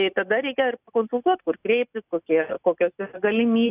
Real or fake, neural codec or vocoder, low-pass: real; none; 3.6 kHz